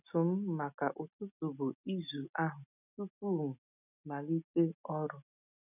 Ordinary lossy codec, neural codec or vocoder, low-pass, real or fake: none; none; 3.6 kHz; real